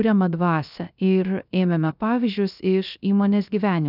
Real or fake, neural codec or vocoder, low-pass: fake; codec, 16 kHz, 0.3 kbps, FocalCodec; 5.4 kHz